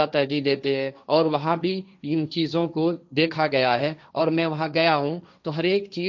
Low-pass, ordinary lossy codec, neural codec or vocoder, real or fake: 7.2 kHz; none; codec, 16 kHz, 1.1 kbps, Voila-Tokenizer; fake